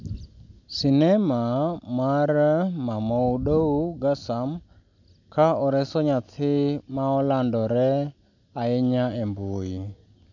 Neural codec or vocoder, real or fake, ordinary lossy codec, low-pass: none; real; none; 7.2 kHz